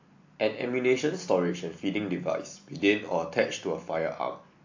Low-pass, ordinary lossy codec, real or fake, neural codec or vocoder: 7.2 kHz; AAC, 48 kbps; real; none